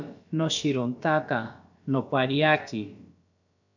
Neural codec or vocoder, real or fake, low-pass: codec, 16 kHz, about 1 kbps, DyCAST, with the encoder's durations; fake; 7.2 kHz